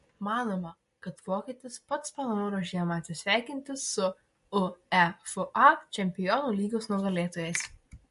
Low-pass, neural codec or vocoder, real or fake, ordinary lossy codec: 14.4 kHz; none; real; MP3, 48 kbps